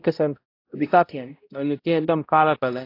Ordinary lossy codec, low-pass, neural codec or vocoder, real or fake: AAC, 32 kbps; 5.4 kHz; codec, 16 kHz, 0.5 kbps, X-Codec, HuBERT features, trained on balanced general audio; fake